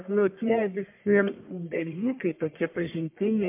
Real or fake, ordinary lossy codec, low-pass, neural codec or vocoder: fake; MP3, 32 kbps; 3.6 kHz; codec, 44.1 kHz, 1.7 kbps, Pupu-Codec